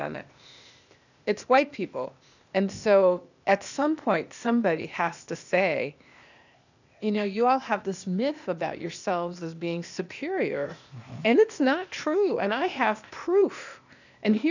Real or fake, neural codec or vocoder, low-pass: fake; codec, 16 kHz, 0.8 kbps, ZipCodec; 7.2 kHz